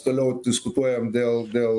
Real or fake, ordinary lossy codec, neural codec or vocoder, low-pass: real; AAC, 64 kbps; none; 10.8 kHz